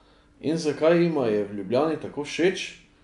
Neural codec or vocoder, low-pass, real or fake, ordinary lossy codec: none; 10.8 kHz; real; none